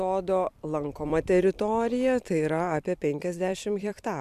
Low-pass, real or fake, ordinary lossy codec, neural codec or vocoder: 14.4 kHz; fake; MP3, 96 kbps; vocoder, 44.1 kHz, 128 mel bands every 256 samples, BigVGAN v2